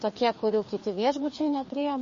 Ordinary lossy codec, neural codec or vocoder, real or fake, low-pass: MP3, 32 kbps; codec, 16 kHz, 2 kbps, FreqCodec, larger model; fake; 7.2 kHz